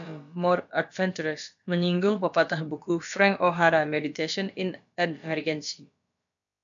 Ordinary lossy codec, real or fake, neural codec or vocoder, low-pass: MP3, 96 kbps; fake; codec, 16 kHz, about 1 kbps, DyCAST, with the encoder's durations; 7.2 kHz